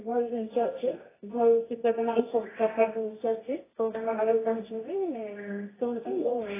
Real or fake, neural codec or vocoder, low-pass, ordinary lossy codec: fake; codec, 24 kHz, 0.9 kbps, WavTokenizer, medium music audio release; 3.6 kHz; AAC, 16 kbps